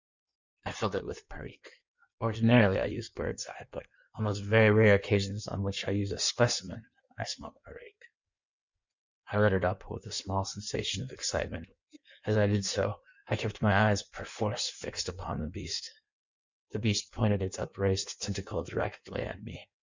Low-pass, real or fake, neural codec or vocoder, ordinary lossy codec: 7.2 kHz; fake; codec, 16 kHz in and 24 kHz out, 1.1 kbps, FireRedTTS-2 codec; Opus, 64 kbps